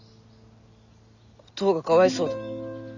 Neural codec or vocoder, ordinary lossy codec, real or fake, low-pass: none; none; real; 7.2 kHz